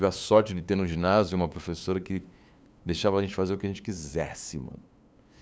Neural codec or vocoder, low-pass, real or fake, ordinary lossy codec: codec, 16 kHz, 2 kbps, FunCodec, trained on LibriTTS, 25 frames a second; none; fake; none